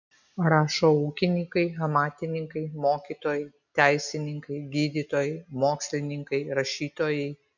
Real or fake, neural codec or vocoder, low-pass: real; none; 7.2 kHz